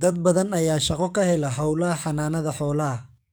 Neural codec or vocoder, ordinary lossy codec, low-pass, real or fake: codec, 44.1 kHz, 7.8 kbps, DAC; none; none; fake